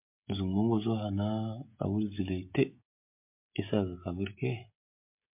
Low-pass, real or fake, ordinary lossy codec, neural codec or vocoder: 3.6 kHz; fake; MP3, 32 kbps; codec, 16 kHz, 16 kbps, FreqCodec, smaller model